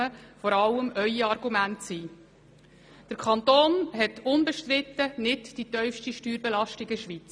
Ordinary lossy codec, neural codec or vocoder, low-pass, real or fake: none; none; none; real